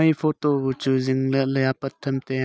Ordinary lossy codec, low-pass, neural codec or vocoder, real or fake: none; none; none; real